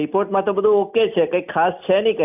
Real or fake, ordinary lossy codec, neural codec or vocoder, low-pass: real; none; none; 3.6 kHz